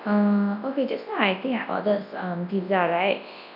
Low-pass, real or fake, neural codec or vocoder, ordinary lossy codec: 5.4 kHz; fake; codec, 24 kHz, 0.9 kbps, WavTokenizer, large speech release; none